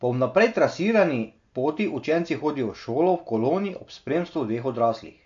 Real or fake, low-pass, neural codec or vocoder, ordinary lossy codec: real; 7.2 kHz; none; MP3, 48 kbps